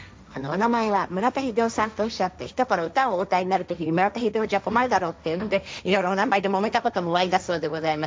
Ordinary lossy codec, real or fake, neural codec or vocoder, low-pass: none; fake; codec, 16 kHz, 1.1 kbps, Voila-Tokenizer; none